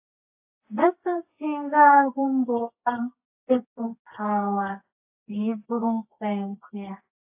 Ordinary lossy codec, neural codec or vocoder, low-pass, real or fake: AAC, 32 kbps; codec, 24 kHz, 0.9 kbps, WavTokenizer, medium music audio release; 3.6 kHz; fake